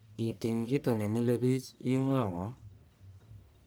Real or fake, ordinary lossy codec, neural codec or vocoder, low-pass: fake; none; codec, 44.1 kHz, 1.7 kbps, Pupu-Codec; none